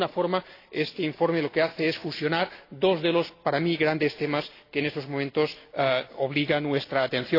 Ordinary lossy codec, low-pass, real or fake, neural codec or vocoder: AAC, 32 kbps; 5.4 kHz; real; none